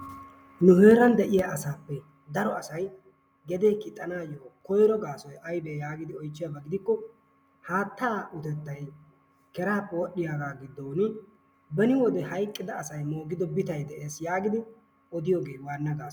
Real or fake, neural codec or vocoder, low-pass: real; none; 19.8 kHz